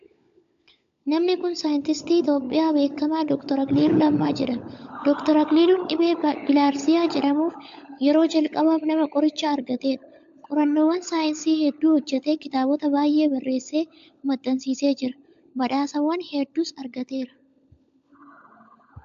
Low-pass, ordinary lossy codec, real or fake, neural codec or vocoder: 7.2 kHz; AAC, 64 kbps; fake; codec, 16 kHz, 16 kbps, FunCodec, trained on LibriTTS, 50 frames a second